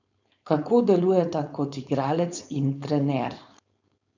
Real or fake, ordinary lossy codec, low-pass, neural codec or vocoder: fake; none; 7.2 kHz; codec, 16 kHz, 4.8 kbps, FACodec